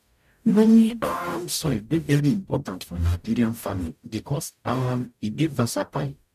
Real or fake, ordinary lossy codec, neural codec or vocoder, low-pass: fake; none; codec, 44.1 kHz, 0.9 kbps, DAC; 14.4 kHz